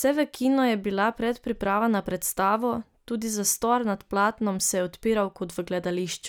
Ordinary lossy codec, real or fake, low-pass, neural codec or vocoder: none; real; none; none